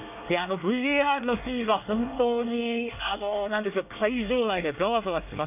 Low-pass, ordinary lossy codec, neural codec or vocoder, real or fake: 3.6 kHz; none; codec, 24 kHz, 1 kbps, SNAC; fake